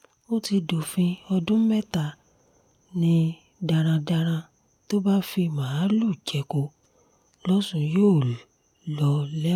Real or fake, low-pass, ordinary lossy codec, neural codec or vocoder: real; 19.8 kHz; none; none